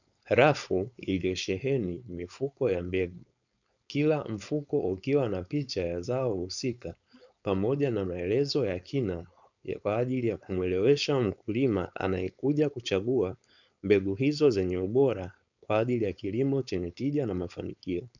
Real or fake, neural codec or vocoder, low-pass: fake; codec, 16 kHz, 4.8 kbps, FACodec; 7.2 kHz